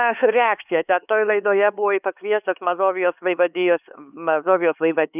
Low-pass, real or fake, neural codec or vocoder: 3.6 kHz; fake; codec, 16 kHz, 4 kbps, X-Codec, HuBERT features, trained on LibriSpeech